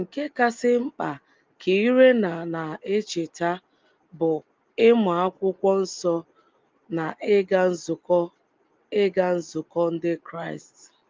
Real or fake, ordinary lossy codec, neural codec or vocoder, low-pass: real; Opus, 24 kbps; none; 7.2 kHz